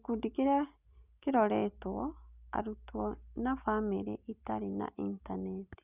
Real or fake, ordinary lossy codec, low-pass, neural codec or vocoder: real; Opus, 64 kbps; 3.6 kHz; none